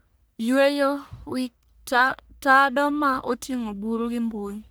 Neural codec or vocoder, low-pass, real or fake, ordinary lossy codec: codec, 44.1 kHz, 1.7 kbps, Pupu-Codec; none; fake; none